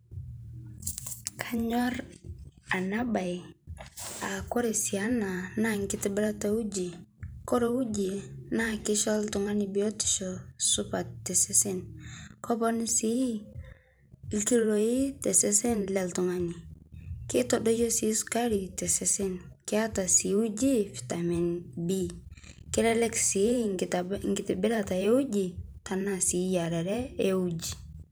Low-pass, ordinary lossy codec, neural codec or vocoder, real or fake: none; none; vocoder, 44.1 kHz, 128 mel bands every 512 samples, BigVGAN v2; fake